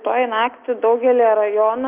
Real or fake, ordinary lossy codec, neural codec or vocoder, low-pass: real; Opus, 32 kbps; none; 3.6 kHz